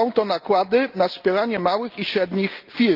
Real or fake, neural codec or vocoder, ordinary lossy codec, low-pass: real; none; Opus, 24 kbps; 5.4 kHz